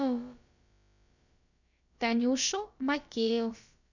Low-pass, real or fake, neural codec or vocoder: 7.2 kHz; fake; codec, 16 kHz, about 1 kbps, DyCAST, with the encoder's durations